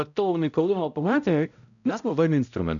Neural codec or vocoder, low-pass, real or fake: codec, 16 kHz, 0.5 kbps, X-Codec, HuBERT features, trained on balanced general audio; 7.2 kHz; fake